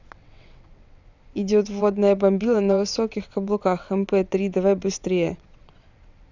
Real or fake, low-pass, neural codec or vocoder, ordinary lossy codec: fake; 7.2 kHz; vocoder, 22.05 kHz, 80 mel bands, WaveNeXt; none